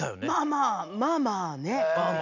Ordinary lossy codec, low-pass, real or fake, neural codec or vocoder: none; 7.2 kHz; real; none